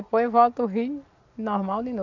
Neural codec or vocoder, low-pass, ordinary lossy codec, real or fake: none; 7.2 kHz; none; real